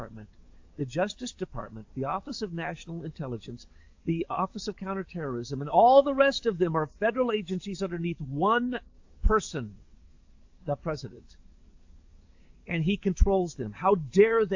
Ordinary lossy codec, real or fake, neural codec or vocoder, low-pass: AAC, 48 kbps; fake; codec, 44.1 kHz, 7.8 kbps, DAC; 7.2 kHz